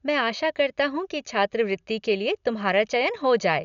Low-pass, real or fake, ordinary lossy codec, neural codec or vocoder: 7.2 kHz; real; none; none